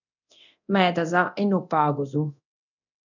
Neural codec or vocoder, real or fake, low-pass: codec, 24 kHz, 0.9 kbps, DualCodec; fake; 7.2 kHz